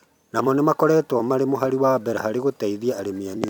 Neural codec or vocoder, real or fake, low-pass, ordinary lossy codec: vocoder, 44.1 kHz, 128 mel bands every 256 samples, BigVGAN v2; fake; 19.8 kHz; none